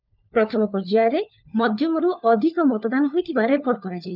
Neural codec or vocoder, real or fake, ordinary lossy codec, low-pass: codec, 16 kHz, 4 kbps, FunCodec, trained on LibriTTS, 50 frames a second; fake; none; 5.4 kHz